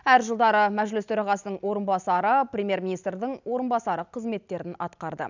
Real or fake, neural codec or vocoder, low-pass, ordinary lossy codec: real; none; 7.2 kHz; none